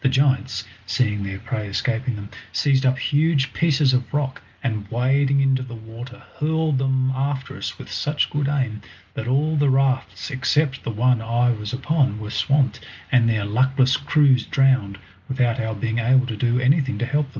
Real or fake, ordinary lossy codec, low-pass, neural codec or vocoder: real; Opus, 32 kbps; 7.2 kHz; none